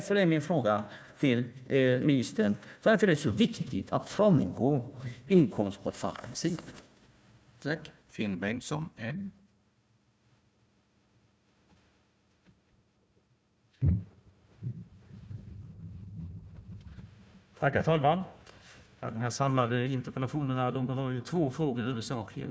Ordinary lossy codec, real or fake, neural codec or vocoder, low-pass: none; fake; codec, 16 kHz, 1 kbps, FunCodec, trained on Chinese and English, 50 frames a second; none